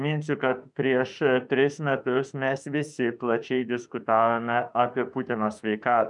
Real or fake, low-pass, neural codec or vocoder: fake; 10.8 kHz; autoencoder, 48 kHz, 32 numbers a frame, DAC-VAE, trained on Japanese speech